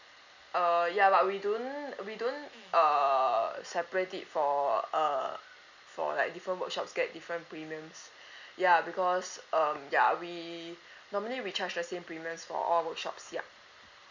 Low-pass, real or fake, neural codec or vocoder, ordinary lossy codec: 7.2 kHz; real; none; Opus, 64 kbps